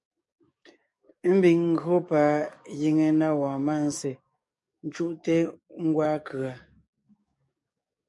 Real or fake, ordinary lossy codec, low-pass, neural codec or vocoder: fake; MP3, 64 kbps; 10.8 kHz; codec, 44.1 kHz, 7.8 kbps, DAC